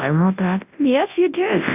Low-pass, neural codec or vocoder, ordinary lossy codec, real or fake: 3.6 kHz; codec, 24 kHz, 0.9 kbps, WavTokenizer, large speech release; AAC, 32 kbps; fake